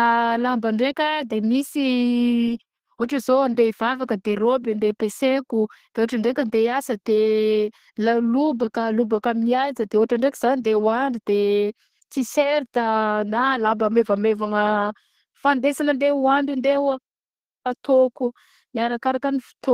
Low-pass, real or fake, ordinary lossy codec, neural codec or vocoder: 14.4 kHz; fake; Opus, 16 kbps; codec, 32 kHz, 1.9 kbps, SNAC